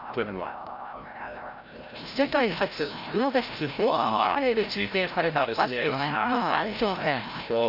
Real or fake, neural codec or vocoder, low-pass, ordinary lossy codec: fake; codec, 16 kHz, 0.5 kbps, FreqCodec, larger model; 5.4 kHz; none